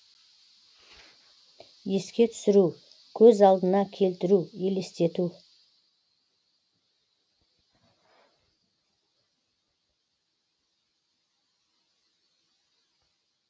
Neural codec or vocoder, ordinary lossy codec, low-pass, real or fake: none; none; none; real